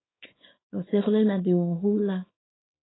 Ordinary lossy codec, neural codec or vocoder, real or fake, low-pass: AAC, 16 kbps; codec, 24 kHz, 0.9 kbps, WavTokenizer, small release; fake; 7.2 kHz